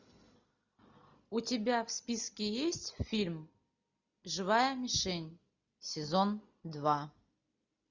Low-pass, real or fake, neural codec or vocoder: 7.2 kHz; real; none